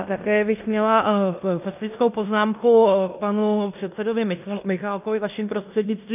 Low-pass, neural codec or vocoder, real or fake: 3.6 kHz; codec, 16 kHz in and 24 kHz out, 0.9 kbps, LongCat-Audio-Codec, fine tuned four codebook decoder; fake